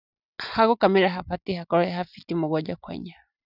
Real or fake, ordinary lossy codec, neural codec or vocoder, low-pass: real; none; none; 5.4 kHz